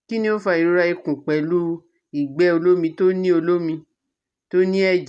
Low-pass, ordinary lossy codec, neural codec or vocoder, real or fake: none; none; none; real